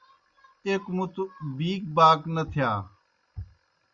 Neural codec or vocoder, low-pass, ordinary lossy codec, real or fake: none; 7.2 kHz; Opus, 64 kbps; real